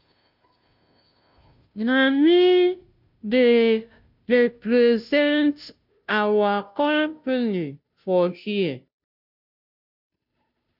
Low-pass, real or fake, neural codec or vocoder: 5.4 kHz; fake; codec, 16 kHz, 0.5 kbps, FunCodec, trained on Chinese and English, 25 frames a second